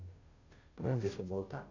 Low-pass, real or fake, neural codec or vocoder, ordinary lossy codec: 7.2 kHz; fake; codec, 16 kHz, 0.5 kbps, FunCodec, trained on Chinese and English, 25 frames a second; none